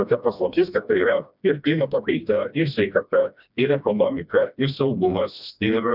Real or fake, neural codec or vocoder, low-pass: fake; codec, 16 kHz, 1 kbps, FreqCodec, smaller model; 5.4 kHz